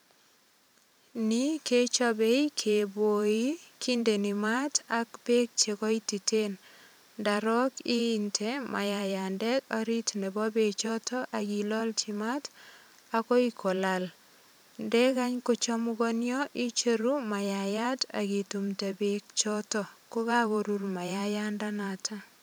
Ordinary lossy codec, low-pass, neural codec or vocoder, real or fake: none; none; vocoder, 44.1 kHz, 128 mel bands every 512 samples, BigVGAN v2; fake